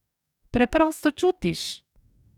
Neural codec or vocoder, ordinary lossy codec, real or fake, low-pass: codec, 44.1 kHz, 2.6 kbps, DAC; none; fake; 19.8 kHz